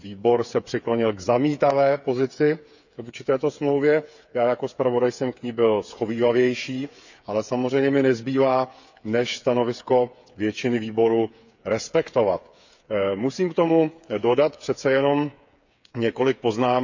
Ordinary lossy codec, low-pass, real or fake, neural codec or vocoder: none; 7.2 kHz; fake; codec, 16 kHz, 8 kbps, FreqCodec, smaller model